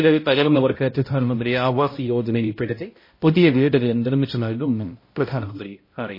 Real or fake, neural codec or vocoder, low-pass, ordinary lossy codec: fake; codec, 16 kHz, 0.5 kbps, X-Codec, HuBERT features, trained on balanced general audio; 5.4 kHz; MP3, 24 kbps